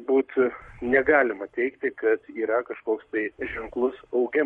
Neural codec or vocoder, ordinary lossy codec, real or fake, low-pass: codec, 44.1 kHz, 7.8 kbps, Pupu-Codec; MP3, 48 kbps; fake; 19.8 kHz